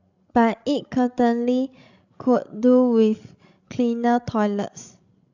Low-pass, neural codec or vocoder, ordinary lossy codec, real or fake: 7.2 kHz; codec, 16 kHz, 16 kbps, FreqCodec, larger model; none; fake